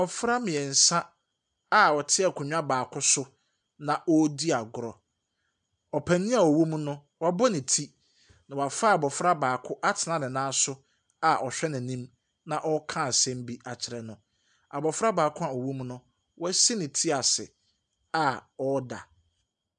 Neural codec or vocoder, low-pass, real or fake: none; 9.9 kHz; real